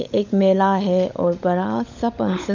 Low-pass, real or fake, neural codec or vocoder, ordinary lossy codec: 7.2 kHz; fake; codec, 16 kHz, 16 kbps, FunCodec, trained on LibriTTS, 50 frames a second; none